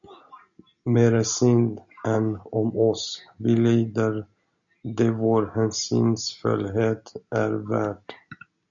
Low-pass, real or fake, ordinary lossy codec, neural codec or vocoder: 7.2 kHz; real; MP3, 64 kbps; none